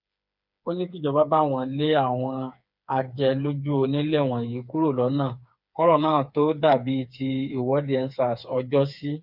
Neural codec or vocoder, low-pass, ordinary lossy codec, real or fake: codec, 16 kHz, 4 kbps, FreqCodec, smaller model; 5.4 kHz; none; fake